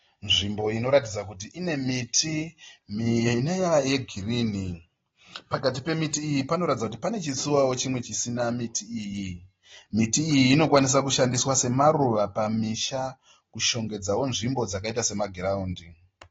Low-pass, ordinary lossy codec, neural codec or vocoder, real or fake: 7.2 kHz; AAC, 24 kbps; none; real